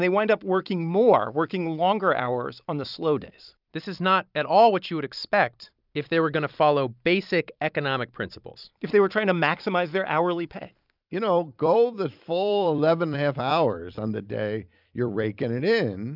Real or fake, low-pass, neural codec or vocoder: fake; 5.4 kHz; vocoder, 44.1 kHz, 128 mel bands every 256 samples, BigVGAN v2